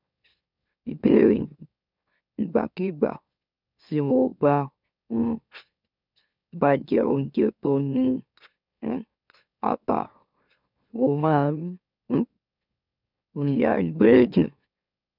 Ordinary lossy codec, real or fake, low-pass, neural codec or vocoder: none; fake; 5.4 kHz; autoencoder, 44.1 kHz, a latent of 192 numbers a frame, MeloTTS